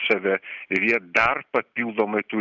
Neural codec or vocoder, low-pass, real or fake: none; 7.2 kHz; real